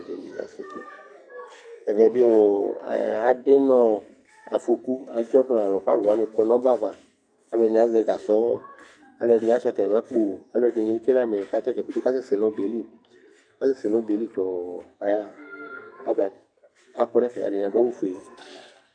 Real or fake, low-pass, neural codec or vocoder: fake; 9.9 kHz; codec, 44.1 kHz, 2.6 kbps, SNAC